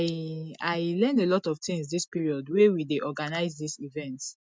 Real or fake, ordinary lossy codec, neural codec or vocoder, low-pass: real; none; none; none